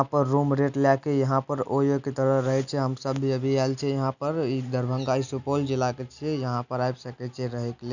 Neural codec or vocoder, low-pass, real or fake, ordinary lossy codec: none; 7.2 kHz; real; none